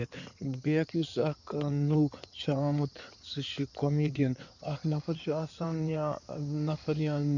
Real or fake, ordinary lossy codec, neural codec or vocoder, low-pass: fake; none; codec, 16 kHz in and 24 kHz out, 2.2 kbps, FireRedTTS-2 codec; 7.2 kHz